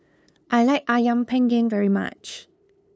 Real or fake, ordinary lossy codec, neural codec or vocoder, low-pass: fake; none; codec, 16 kHz, 2 kbps, FunCodec, trained on LibriTTS, 25 frames a second; none